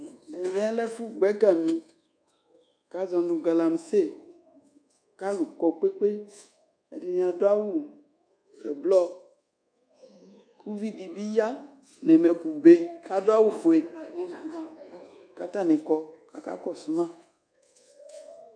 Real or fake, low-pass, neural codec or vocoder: fake; 9.9 kHz; codec, 24 kHz, 1.2 kbps, DualCodec